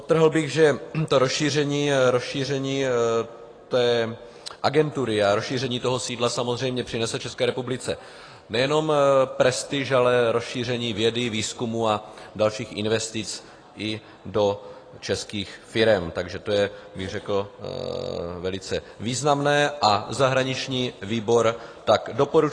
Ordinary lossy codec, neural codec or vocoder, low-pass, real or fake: AAC, 32 kbps; none; 9.9 kHz; real